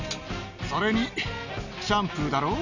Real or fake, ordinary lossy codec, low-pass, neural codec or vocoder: real; none; 7.2 kHz; none